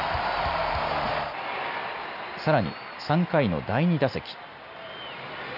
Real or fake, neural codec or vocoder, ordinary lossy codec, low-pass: real; none; none; 5.4 kHz